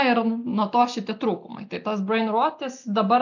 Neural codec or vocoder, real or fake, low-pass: none; real; 7.2 kHz